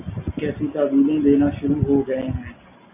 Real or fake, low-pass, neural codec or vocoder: real; 3.6 kHz; none